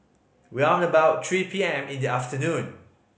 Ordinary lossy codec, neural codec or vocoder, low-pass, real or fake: none; none; none; real